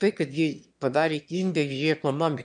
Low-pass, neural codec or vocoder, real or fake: 9.9 kHz; autoencoder, 22.05 kHz, a latent of 192 numbers a frame, VITS, trained on one speaker; fake